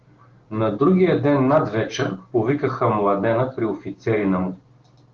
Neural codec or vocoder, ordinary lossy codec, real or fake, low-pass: none; Opus, 16 kbps; real; 7.2 kHz